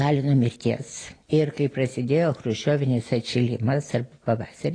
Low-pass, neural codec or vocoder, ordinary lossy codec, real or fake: 9.9 kHz; none; AAC, 32 kbps; real